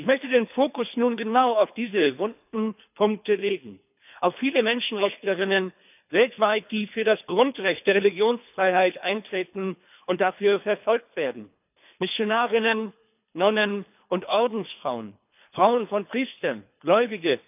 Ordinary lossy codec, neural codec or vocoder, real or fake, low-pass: none; codec, 24 kHz, 3 kbps, HILCodec; fake; 3.6 kHz